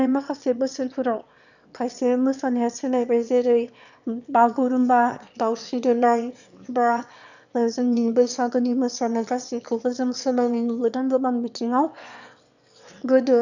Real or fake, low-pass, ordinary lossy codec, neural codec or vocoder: fake; 7.2 kHz; none; autoencoder, 22.05 kHz, a latent of 192 numbers a frame, VITS, trained on one speaker